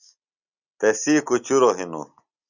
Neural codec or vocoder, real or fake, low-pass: none; real; 7.2 kHz